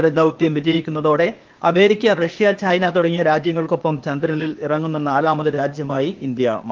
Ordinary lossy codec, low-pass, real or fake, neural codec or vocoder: Opus, 32 kbps; 7.2 kHz; fake; codec, 16 kHz, 0.8 kbps, ZipCodec